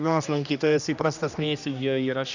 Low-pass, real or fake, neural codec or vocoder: 7.2 kHz; fake; codec, 16 kHz, 1 kbps, X-Codec, HuBERT features, trained on general audio